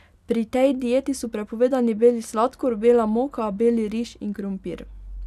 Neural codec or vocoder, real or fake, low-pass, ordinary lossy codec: none; real; 14.4 kHz; none